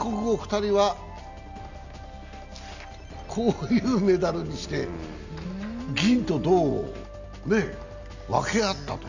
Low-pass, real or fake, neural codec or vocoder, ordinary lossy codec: 7.2 kHz; real; none; none